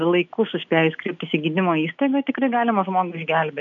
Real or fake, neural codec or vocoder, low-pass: real; none; 7.2 kHz